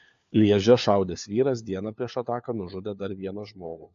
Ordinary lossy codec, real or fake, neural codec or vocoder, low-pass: AAC, 64 kbps; fake; codec, 16 kHz, 4 kbps, FunCodec, trained on LibriTTS, 50 frames a second; 7.2 kHz